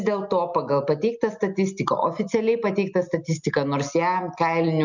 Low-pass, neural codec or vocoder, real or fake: 7.2 kHz; none; real